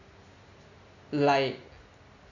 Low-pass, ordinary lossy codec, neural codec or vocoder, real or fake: 7.2 kHz; none; none; real